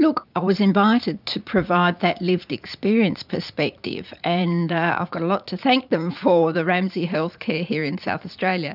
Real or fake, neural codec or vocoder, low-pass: fake; vocoder, 44.1 kHz, 80 mel bands, Vocos; 5.4 kHz